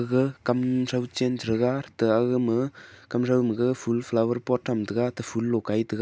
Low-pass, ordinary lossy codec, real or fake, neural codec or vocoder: none; none; real; none